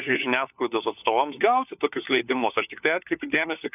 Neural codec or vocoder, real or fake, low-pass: codec, 16 kHz, 4 kbps, FunCodec, trained on LibriTTS, 50 frames a second; fake; 3.6 kHz